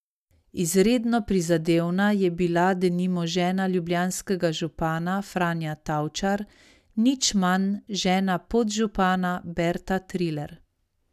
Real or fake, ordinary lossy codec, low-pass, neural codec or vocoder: real; none; 14.4 kHz; none